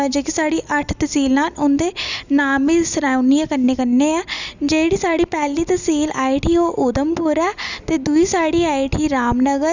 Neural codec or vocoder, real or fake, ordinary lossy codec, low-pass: none; real; none; 7.2 kHz